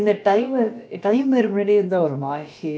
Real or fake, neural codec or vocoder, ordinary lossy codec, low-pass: fake; codec, 16 kHz, about 1 kbps, DyCAST, with the encoder's durations; none; none